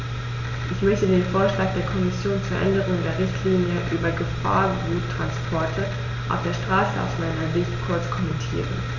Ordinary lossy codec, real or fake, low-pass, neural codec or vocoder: none; real; 7.2 kHz; none